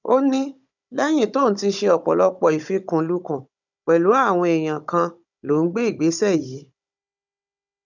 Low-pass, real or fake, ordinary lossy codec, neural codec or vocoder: 7.2 kHz; fake; none; codec, 16 kHz, 16 kbps, FunCodec, trained on Chinese and English, 50 frames a second